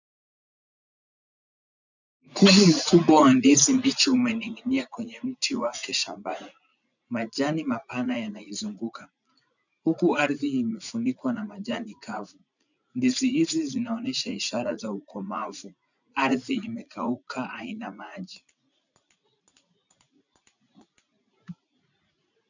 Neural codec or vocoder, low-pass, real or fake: vocoder, 44.1 kHz, 80 mel bands, Vocos; 7.2 kHz; fake